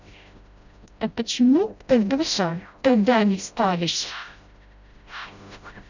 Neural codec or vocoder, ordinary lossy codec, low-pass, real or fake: codec, 16 kHz, 0.5 kbps, FreqCodec, smaller model; none; 7.2 kHz; fake